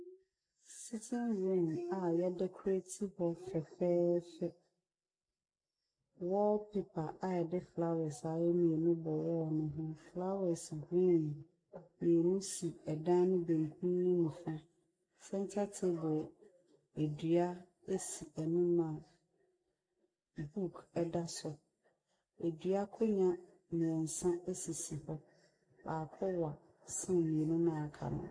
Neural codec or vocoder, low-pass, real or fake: none; 9.9 kHz; real